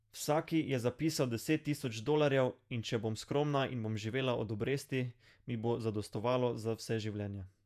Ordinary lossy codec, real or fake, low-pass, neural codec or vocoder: none; fake; 14.4 kHz; vocoder, 48 kHz, 128 mel bands, Vocos